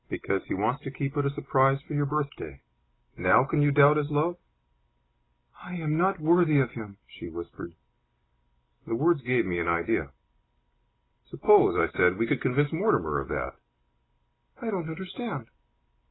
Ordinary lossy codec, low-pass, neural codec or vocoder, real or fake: AAC, 16 kbps; 7.2 kHz; none; real